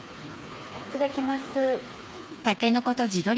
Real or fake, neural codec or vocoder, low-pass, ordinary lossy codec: fake; codec, 16 kHz, 4 kbps, FreqCodec, smaller model; none; none